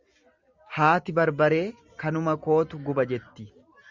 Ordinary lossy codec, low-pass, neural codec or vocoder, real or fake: Opus, 64 kbps; 7.2 kHz; none; real